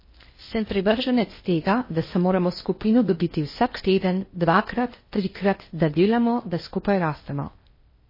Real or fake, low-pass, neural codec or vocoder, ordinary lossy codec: fake; 5.4 kHz; codec, 16 kHz in and 24 kHz out, 0.6 kbps, FocalCodec, streaming, 4096 codes; MP3, 24 kbps